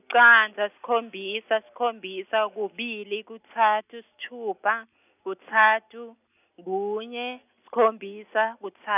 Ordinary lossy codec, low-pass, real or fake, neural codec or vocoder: none; 3.6 kHz; real; none